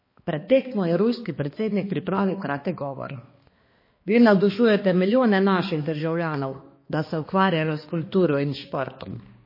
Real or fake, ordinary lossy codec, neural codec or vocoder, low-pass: fake; MP3, 24 kbps; codec, 16 kHz, 2 kbps, X-Codec, HuBERT features, trained on balanced general audio; 5.4 kHz